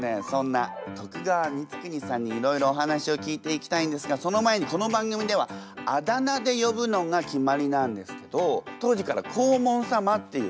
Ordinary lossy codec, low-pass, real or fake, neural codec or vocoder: none; none; real; none